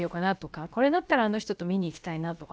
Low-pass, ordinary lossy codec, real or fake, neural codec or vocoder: none; none; fake; codec, 16 kHz, about 1 kbps, DyCAST, with the encoder's durations